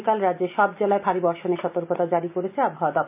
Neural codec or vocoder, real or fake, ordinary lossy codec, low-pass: none; real; none; 3.6 kHz